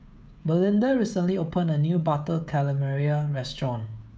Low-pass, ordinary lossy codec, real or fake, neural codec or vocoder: none; none; fake; codec, 16 kHz, 16 kbps, FreqCodec, smaller model